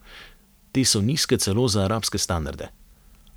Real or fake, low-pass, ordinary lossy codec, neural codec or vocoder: fake; none; none; vocoder, 44.1 kHz, 128 mel bands every 512 samples, BigVGAN v2